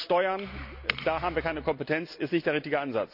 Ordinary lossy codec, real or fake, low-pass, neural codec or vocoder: none; real; 5.4 kHz; none